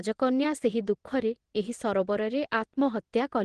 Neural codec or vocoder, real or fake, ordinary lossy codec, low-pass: vocoder, 22.05 kHz, 80 mel bands, WaveNeXt; fake; Opus, 16 kbps; 9.9 kHz